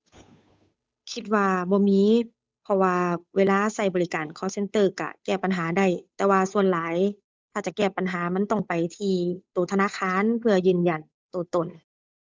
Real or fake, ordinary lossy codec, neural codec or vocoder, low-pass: fake; none; codec, 16 kHz, 8 kbps, FunCodec, trained on Chinese and English, 25 frames a second; none